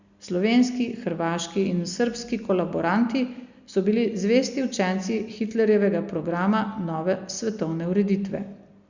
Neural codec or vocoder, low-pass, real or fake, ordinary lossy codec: none; 7.2 kHz; real; Opus, 64 kbps